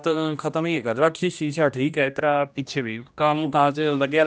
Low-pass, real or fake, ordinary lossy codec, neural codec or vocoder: none; fake; none; codec, 16 kHz, 1 kbps, X-Codec, HuBERT features, trained on general audio